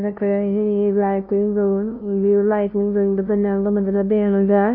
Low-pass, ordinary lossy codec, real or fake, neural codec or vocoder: 5.4 kHz; none; fake; codec, 16 kHz, 0.5 kbps, FunCodec, trained on LibriTTS, 25 frames a second